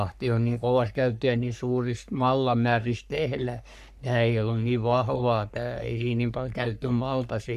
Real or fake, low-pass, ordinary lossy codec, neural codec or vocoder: fake; 14.4 kHz; none; codec, 44.1 kHz, 3.4 kbps, Pupu-Codec